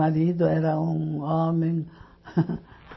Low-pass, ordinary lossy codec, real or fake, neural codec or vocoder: 7.2 kHz; MP3, 24 kbps; real; none